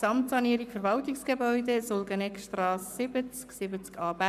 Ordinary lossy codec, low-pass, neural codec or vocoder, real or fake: none; 14.4 kHz; codec, 44.1 kHz, 7.8 kbps, Pupu-Codec; fake